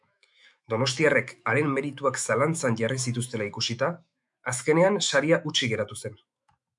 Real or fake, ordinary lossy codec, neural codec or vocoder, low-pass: fake; MP3, 96 kbps; autoencoder, 48 kHz, 128 numbers a frame, DAC-VAE, trained on Japanese speech; 10.8 kHz